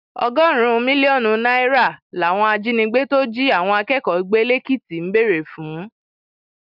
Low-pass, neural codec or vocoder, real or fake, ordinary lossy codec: 5.4 kHz; none; real; none